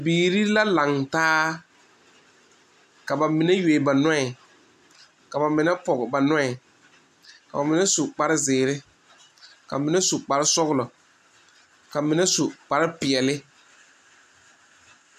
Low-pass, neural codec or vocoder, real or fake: 14.4 kHz; none; real